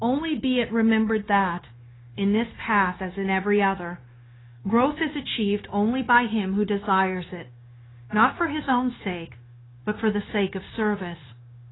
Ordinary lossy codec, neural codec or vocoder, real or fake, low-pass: AAC, 16 kbps; codec, 16 kHz, 0.9 kbps, LongCat-Audio-Codec; fake; 7.2 kHz